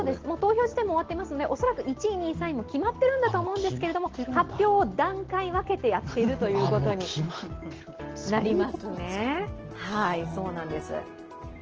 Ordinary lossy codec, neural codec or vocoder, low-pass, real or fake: Opus, 16 kbps; none; 7.2 kHz; real